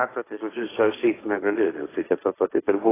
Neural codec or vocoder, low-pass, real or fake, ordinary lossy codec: codec, 16 kHz, 1.1 kbps, Voila-Tokenizer; 3.6 kHz; fake; AAC, 16 kbps